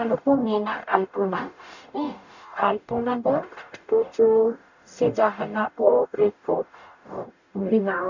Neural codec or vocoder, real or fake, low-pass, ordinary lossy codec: codec, 44.1 kHz, 0.9 kbps, DAC; fake; 7.2 kHz; none